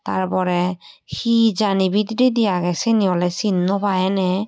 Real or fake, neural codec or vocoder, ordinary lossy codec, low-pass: real; none; none; none